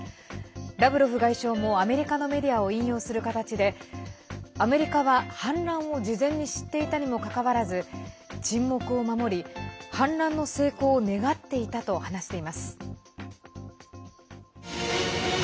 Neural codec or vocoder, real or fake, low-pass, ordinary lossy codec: none; real; none; none